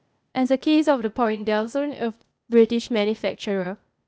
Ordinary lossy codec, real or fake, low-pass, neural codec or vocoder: none; fake; none; codec, 16 kHz, 0.8 kbps, ZipCodec